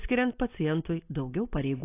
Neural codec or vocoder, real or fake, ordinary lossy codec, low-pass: none; real; AAC, 24 kbps; 3.6 kHz